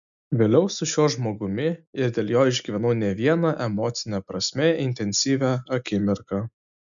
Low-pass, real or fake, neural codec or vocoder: 7.2 kHz; real; none